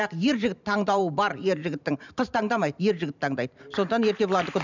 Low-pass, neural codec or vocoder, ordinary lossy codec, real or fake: 7.2 kHz; vocoder, 44.1 kHz, 128 mel bands every 512 samples, BigVGAN v2; none; fake